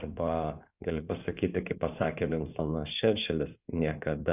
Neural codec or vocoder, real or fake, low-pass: codec, 16 kHz in and 24 kHz out, 2.2 kbps, FireRedTTS-2 codec; fake; 3.6 kHz